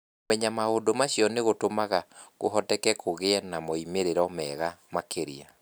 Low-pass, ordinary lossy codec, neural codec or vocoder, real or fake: none; none; none; real